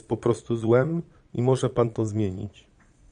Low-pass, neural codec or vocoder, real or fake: 9.9 kHz; vocoder, 22.05 kHz, 80 mel bands, Vocos; fake